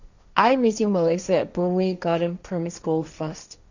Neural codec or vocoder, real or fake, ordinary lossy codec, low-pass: codec, 16 kHz, 1.1 kbps, Voila-Tokenizer; fake; none; 7.2 kHz